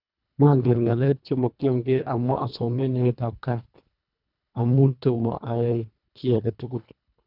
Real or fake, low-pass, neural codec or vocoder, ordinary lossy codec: fake; 5.4 kHz; codec, 24 kHz, 1.5 kbps, HILCodec; none